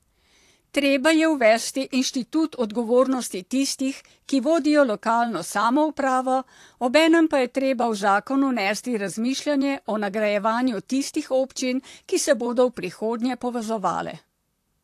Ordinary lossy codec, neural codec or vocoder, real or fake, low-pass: AAC, 64 kbps; vocoder, 44.1 kHz, 128 mel bands, Pupu-Vocoder; fake; 14.4 kHz